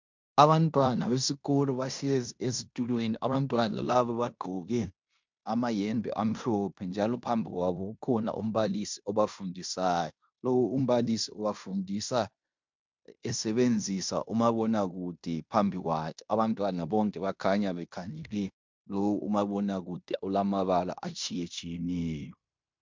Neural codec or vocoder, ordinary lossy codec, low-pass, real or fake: codec, 16 kHz in and 24 kHz out, 0.9 kbps, LongCat-Audio-Codec, fine tuned four codebook decoder; MP3, 64 kbps; 7.2 kHz; fake